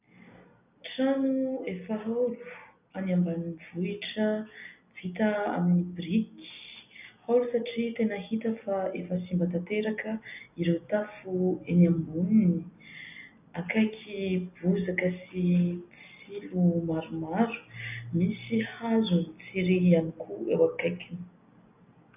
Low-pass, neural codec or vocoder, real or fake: 3.6 kHz; none; real